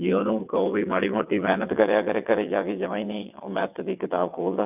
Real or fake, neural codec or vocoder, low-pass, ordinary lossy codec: fake; vocoder, 22.05 kHz, 80 mel bands, WaveNeXt; 3.6 kHz; none